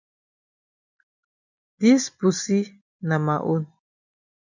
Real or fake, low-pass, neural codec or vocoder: real; 7.2 kHz; none